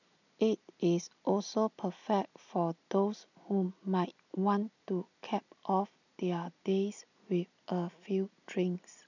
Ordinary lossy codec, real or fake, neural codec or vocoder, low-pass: none; real; none; 7.2 kHz